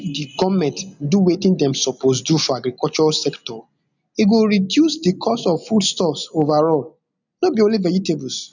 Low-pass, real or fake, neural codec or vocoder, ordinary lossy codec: 7.2 kHz; real; none; none